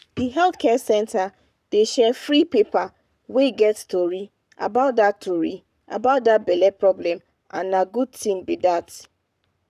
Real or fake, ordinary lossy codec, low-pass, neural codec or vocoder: fake; none; 14.4 kHz; codec, 44.1 kHz, 7.8 kbps, Pupu-Codec